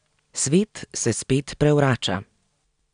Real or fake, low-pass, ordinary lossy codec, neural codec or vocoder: real; 9.9 kHz; none; none